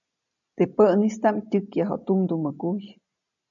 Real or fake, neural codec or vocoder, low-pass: real; none; 7.2 kHz